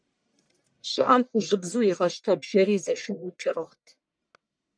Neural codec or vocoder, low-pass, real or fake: codec, 44.1 kHz, 1.7 kbps, Pupu-Codec; 9.9 kHz; fake